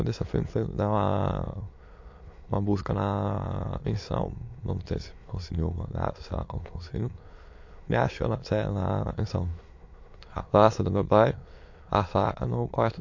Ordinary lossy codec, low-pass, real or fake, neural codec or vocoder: MP3, 48 kbps; 7.2 kHz; fake; autoencoder, 22.05 kHz, a latent of 192 numbers a frame, VITS, trained on many speakers